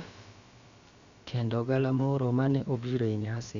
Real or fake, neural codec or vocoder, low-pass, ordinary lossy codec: fake; codec, 16 kHz, about 1 kbps, DyCAST, with the encoder's durations; 7.2 kHz; Opus, 64 kbps